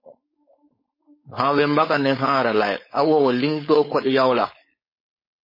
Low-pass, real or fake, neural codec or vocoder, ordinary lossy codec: 5.4 kHz; fake; codec, 16 kHz, 4.8 kbps, FACodec; MP3, 24 kbps